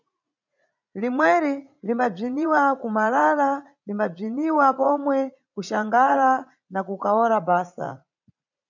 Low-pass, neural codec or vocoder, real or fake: 7.2 kHz; vocoder, 44.1 kHz, 80 mel bands, Vocos; fake